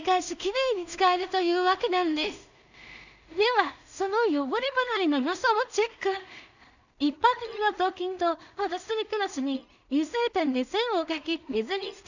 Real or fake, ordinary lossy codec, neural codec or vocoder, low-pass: fake; none; codec, 16 kHz in and 24 kHz out, 0.4 kbps, LongCat-Audio-Codec, two codebook decoder; 7.2 kHz